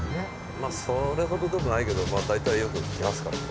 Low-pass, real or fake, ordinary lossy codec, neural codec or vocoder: none; real; none; none